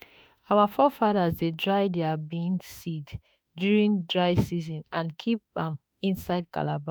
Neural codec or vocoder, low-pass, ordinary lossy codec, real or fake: autoencoder, 48 kHz, 32 numbers a frame, DAC-VAE, trained on Japanese speech; none; none; fake